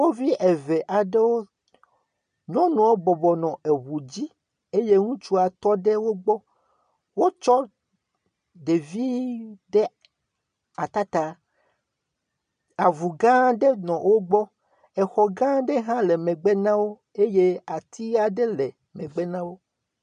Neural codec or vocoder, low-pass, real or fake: none; 9.9 kHz; real